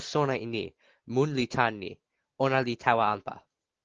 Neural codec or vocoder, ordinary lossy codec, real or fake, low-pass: none; Opus, 16 kbps; real; 7.2 kHz